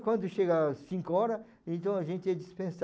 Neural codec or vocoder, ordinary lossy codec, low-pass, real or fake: none; none; none; real